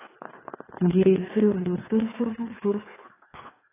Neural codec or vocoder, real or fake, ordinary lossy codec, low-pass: codec, 16 kHz, 2 kbps, FreqCodec, larger model; fake; AAC, 16 kbps; 3.6 kHz